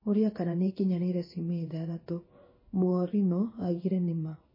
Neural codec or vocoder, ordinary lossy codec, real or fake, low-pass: codec, 16 kHz in and 24 kHz out, 1 kbps, XY-Tokenizer; MP3, 24 kbps; fake; 5.4 kHz